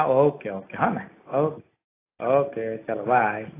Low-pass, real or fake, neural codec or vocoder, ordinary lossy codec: 3.6 kHz; real; none; AAC, 16 kbps